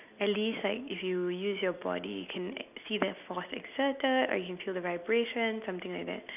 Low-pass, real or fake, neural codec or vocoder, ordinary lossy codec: 3.6 kHz; real; none; none